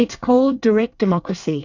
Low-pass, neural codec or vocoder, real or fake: 7.2 kHz; codec, 24 kHz, 1 kbps, SNAC; fake